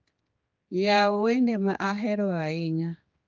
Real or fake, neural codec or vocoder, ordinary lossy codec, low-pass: fake; codec, 16 kHz, 2 kbps, X-Codec, HuBERT features, trained on general audio; Opus, 32 kbps; 7.2 kHz